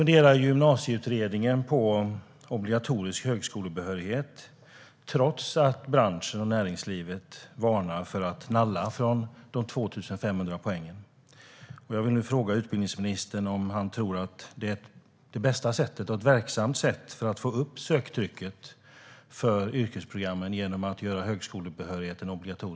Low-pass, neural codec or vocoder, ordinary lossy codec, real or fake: none; none; none; real